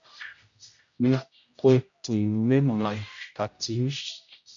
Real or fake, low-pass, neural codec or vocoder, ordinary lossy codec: fake; 7.2 kHz; codec, 16 kHz, 0.5 kbps, X-Codec, HuBERT features, trained on general audio; MP3, 64 kbps